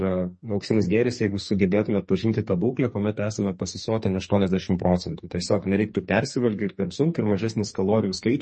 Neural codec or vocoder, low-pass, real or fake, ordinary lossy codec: codec, 44.1 kHz, 2.6 kbps, SNAC; 10.8 kHz; fake; MP3, 32 kbps